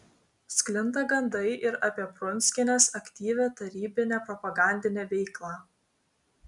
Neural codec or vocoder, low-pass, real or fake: none; 10.8 kHz; real